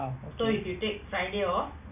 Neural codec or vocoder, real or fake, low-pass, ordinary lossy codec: none; real; 3.6 kHz; none